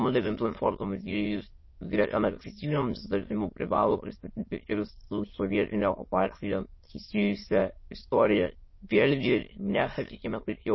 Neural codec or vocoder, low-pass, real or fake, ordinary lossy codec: autoencoder, 22.05 kHz, a latent of 192 numbers a frame, VITS, trained on many speakers; 7.2 kHz; fake; MP3, 24 kbps